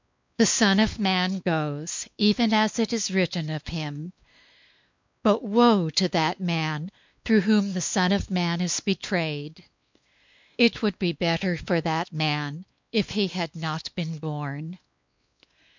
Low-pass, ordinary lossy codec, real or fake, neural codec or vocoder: 7.2 kHz; MP3, 64 kbps; fake; codec, 16 kHz, 2 kbps, X-Codec, WavLM features, trained on Multilingual LibriSpeech